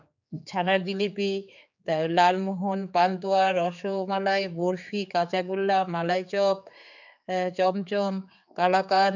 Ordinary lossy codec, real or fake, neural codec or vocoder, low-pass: none; fake; codec, 16 kHz, 4 kbps, X-Codec, HuBERT features, trained on general audio; 7.2 kHz